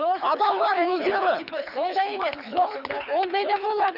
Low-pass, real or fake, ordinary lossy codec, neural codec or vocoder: 5.4 kHz; fake; none; codec, 24 kHz, 6 kbps, HILCodec